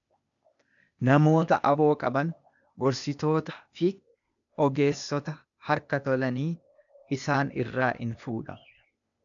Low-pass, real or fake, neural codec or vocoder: 7.2 kHz; fake; codec, 16 kHz, 0.8 kbps, ZipCodec